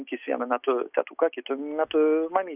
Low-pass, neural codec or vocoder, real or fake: 3.6 kHz; none; real